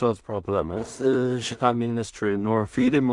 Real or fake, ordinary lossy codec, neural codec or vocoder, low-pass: fake; Opus, 64 kbps; codec, 16 kHz in and 24 kHz out, 0.4 kbps, LongCat-Audio-Codec, two codebook decoder; 10.8 kHz